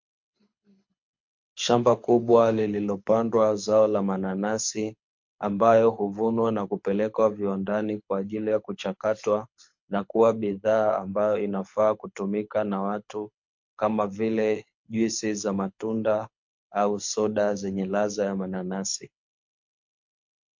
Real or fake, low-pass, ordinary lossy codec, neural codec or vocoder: fake; 7.2 kHz; MP3, 48 kbps; codec, 24 kHz, 6 kbps, HILCodec